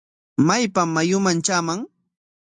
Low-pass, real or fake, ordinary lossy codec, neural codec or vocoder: 10.8 kHz; real; AAC, 64 kbps; none